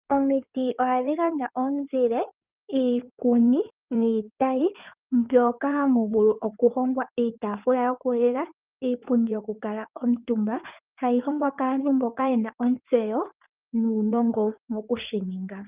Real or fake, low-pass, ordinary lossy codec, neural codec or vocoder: fake; 3.6 kHz; Opus, 24 kbps; codec, 16 kHz in and 24 kHz out, 2.2 kbps, FireRedTTS-2 codec